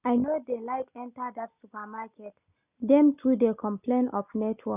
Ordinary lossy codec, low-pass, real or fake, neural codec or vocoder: none; 3.6 kHz; real; none